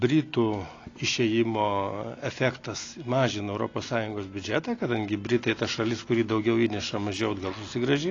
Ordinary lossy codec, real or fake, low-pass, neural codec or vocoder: AAC, 32 kbps; real; 7.2 kHz; none